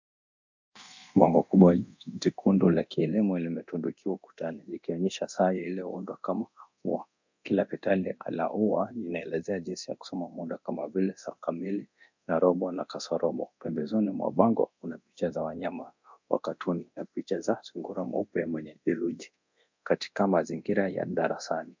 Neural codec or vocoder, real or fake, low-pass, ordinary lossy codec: codec, 24 kHz, 0.9 kbps, DualCodec; fake; 7.2 kHz; MP3, 64 kbps